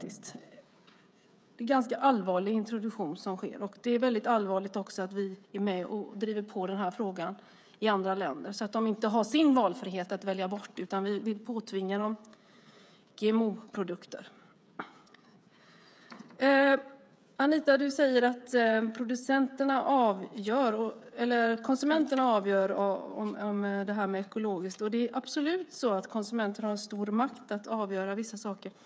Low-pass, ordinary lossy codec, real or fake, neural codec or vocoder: none; none; fake; codec, 16 kHz, 16 kbps, FreqCodec, smaller model